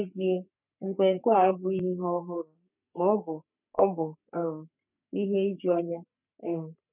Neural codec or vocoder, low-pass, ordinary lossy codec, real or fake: codec, 44.1 kHz, 3.4 kbps, Pupu-Codec; 3.6 kHz; none; fake